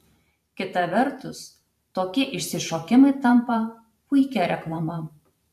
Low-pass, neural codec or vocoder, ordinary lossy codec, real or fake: 14.4 kHz; vocoder, 44.1 kHz, 128 mel bands every 512 samples, BigVGAN v2; AAC, 96 kbps; fake